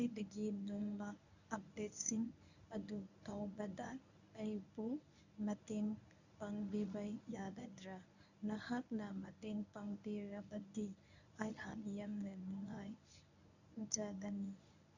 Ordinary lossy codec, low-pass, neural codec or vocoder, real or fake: none; 7.2 kHz; codec, 24 kHz, 0.9 kbps, WavTokenizer, medium speech release version 1; fake